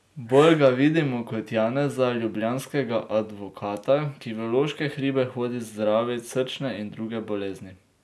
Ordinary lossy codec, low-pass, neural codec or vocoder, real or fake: none; none; none; real